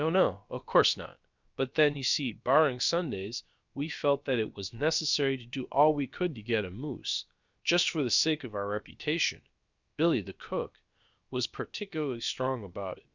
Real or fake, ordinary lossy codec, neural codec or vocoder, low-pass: fake; Opus, 64 kbps; codec, 16 kHz, about 1 kbps, DyCAST, with the encoder's durations; 7.2 kHz